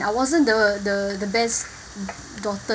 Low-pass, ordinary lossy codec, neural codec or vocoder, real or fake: none; none; none; real